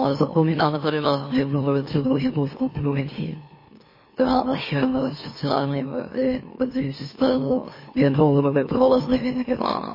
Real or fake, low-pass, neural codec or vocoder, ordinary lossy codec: fake; 5.4 kHz; autoencoder, 44.1 kHz, a latent of 192 numbers a frame, MeloTTS; MP3, 24 kbps